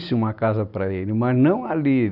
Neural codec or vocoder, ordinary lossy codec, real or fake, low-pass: none; none; real; 5.4 kHz